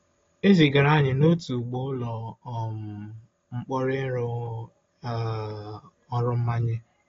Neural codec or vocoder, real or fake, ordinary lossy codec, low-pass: none; real; AAC, 32 kbps; 7.2 kHz